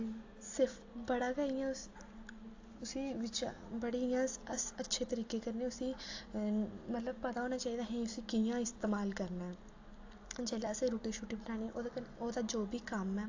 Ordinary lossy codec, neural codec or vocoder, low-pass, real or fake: AAC, 48 kbps; none; 7.2 kHz; real